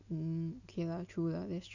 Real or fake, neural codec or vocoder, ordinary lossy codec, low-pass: real; none; none; 7.2 kHz